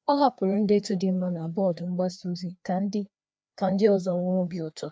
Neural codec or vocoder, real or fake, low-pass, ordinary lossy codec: codec, 16 kHz, 2 kbps, FreqCodec, larger model; fake; none; none